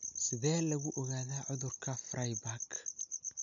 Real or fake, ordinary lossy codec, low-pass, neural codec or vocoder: real; none; 7.2 kHz; none